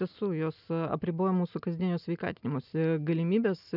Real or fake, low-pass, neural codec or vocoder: real; 5.4 kHz; none